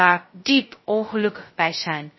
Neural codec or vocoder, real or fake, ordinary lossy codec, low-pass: codec, 16 kHz, 0.2 kbps, FocalCodec; fake; MP3, 24 kbps; 7.2 kHz